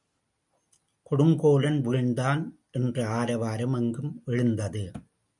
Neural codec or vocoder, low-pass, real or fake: none; 10.8 kHz; real